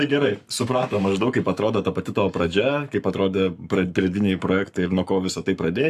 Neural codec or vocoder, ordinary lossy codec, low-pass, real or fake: codec, 44.1 kHz, 7.8 kbps, Pupu-Codec; AAC, 96 kbps; 14.4 kHz; fake